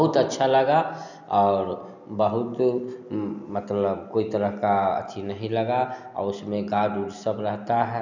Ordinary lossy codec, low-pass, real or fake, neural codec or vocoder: none; 7.2 kHz; real; none